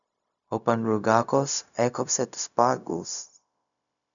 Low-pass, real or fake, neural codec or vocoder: 7.2 kHz; fake; codec, 16 kHz, 0.4 kbps, LongCat-Audio-Codec